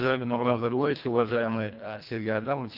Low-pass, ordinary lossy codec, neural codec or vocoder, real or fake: 5.4 kHz; Opus, 32 kbps; codec, 24 kHz, 1.5 kbps, HILCodec; fake